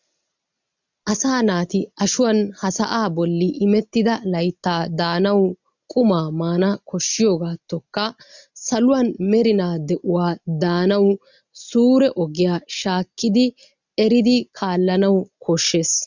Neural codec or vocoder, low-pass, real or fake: none; 7.2 kHz; real